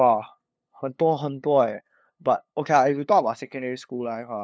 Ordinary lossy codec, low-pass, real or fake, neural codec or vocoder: none; none; fake; codec, 16 kHz, 2 kbps, FunCodec, trained on LibriTTS, 25 frames a second